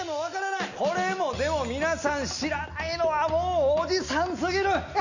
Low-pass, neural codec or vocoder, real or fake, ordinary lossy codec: 7.2 kHz; none; real; none